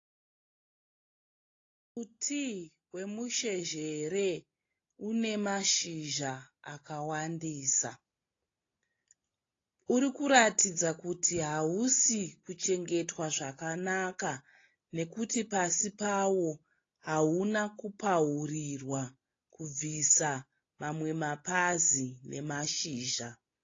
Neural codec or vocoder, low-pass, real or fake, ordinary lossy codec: none; 7.2 kHz; real; AAC, 32 kbps